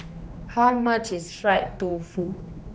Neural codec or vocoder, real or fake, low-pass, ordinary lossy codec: codec, 16 kHz, 2 kbps, X-Codec, HuBERT features, trained on general audio; fake; none; none